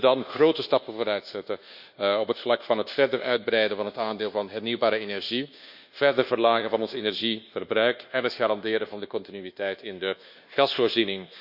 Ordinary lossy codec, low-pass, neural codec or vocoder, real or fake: Opus, 64 kbps; 5.4 kHz; codec, 24 kHz, 1.2 kbps, DualCodec; fake